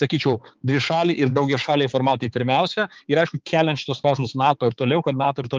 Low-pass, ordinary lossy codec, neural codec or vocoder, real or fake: 7.2 kHz; Opus, 24 kbps; codec, 16 kHz, 4 kbps, X-Codec, HuBERT features, trained on balanced general audio; fake